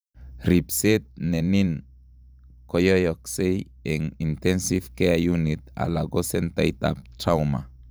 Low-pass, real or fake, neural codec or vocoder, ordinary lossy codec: none; fake; vocoder, 44.1 kHz, 128 mel bands every 512 samples, BigVGAN v2; none